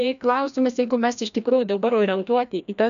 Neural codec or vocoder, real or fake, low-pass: codec, 16 kHz, 1 kbps, FreqCodec, larger model; fake; 7.2 kHz